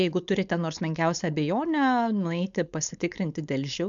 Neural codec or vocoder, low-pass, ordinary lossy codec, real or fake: codec, 16 kHz, 4.8 kbps, FACodec; 7.2 kHz; MP3, 96 kbps; fake